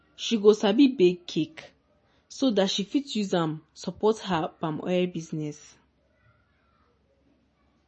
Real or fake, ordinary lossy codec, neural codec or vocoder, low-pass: real; MP3, 32 kbps; none; 10.8 kHz